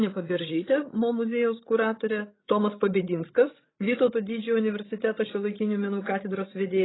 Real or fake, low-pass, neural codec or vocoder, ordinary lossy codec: fake; 7.2 kHz; codec, 16 kHz, 16 kbps, FreqCodec, larger model; AAC, 16 kbps